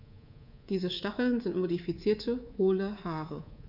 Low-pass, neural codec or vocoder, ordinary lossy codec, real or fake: 5.4 kHz; codec, 24 kHz, 3.1 kbps, DualCodec; none; fake